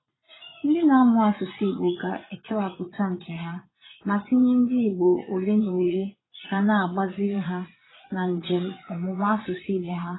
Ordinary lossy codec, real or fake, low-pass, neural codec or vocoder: AAC, 16 kbps; fake; 7.2 kHz; vocoder, 22.05 kHz, 80 mel bands, Vocos